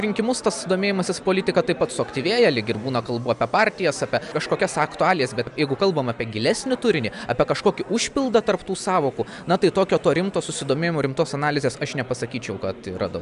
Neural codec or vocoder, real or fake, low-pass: none; real; 10.8 kHz